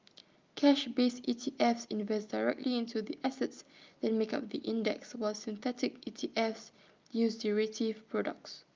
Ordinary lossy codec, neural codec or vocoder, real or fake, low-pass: Opus, 24 kbps; none; real; 7.2 kHz